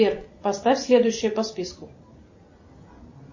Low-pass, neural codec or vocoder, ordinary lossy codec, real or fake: 7.2 kHz; none; MP3, 32 kbps; real